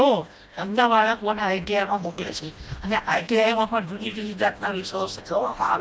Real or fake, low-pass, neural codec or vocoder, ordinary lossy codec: fake; none; codec, 16 kHz, 1 kbps, FreqCodec, smaller model; none